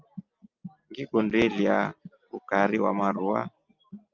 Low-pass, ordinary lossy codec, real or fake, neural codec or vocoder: 7.2 kHz; Opus, 32 kbps; real; none